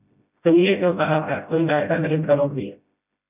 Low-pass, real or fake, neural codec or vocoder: 3.6 kHz; fake; codec, 16 kHz, 0.5 kbps, FreqCodec, smaller model